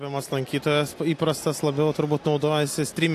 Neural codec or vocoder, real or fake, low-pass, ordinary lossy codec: none; real; 14.4 kHz; AAC, 64 kbps